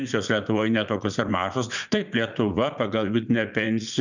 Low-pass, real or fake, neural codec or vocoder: 7.2 kHz; fake; vocoder, 44.1 kHz, 80 mel bands, Vocos